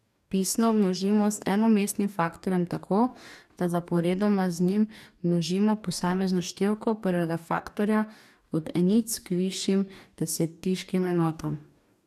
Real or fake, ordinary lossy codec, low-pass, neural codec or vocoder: fake; none; 14.4 kHz; codec, 44.1 kHz, 2.6 kbps, DAC